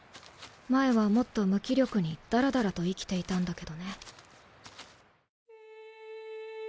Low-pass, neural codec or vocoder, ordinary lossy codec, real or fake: none; none; none; real